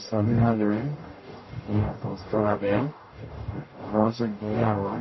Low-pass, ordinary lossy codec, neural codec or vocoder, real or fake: 7.2 kHz; MP3, 24 kbps; codec, 44.1 kHz, 0.9 kbps, DAC; fake